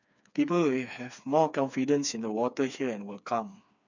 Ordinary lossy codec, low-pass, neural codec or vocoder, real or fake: none; 7.2 kHz; codec, 16 kHz, 4 kbps, FreqCodec, smaller model; fake